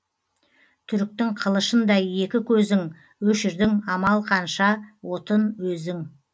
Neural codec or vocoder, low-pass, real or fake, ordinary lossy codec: none; none; real; none